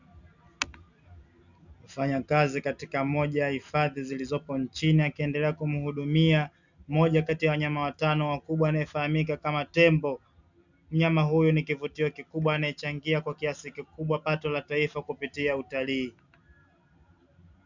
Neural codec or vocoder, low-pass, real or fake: none; 7.2 kHz; real